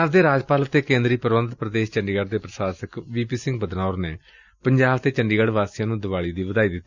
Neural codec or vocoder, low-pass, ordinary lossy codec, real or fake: none; 7.2 kHz; Opus, 64 kbps; real